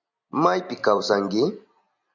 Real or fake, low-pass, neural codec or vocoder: real; 7.2 kHz; none